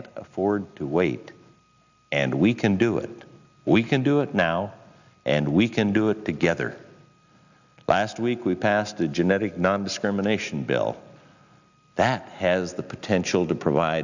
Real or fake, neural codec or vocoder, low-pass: real; none; 7.2 kHz